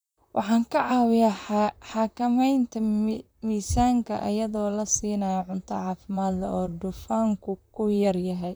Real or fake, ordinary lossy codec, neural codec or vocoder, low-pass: fake; none; vocoder, 44.1 kHz, 128 mel bands, Pupu-Vocoder; none